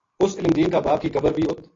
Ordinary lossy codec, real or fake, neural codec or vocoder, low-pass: AAC, 32 kbps; real; none; 7.2 kHz